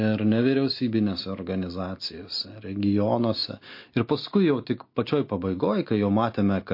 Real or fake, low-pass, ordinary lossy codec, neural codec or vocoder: real; 5.4 kHz; MP3, 32 kbps; none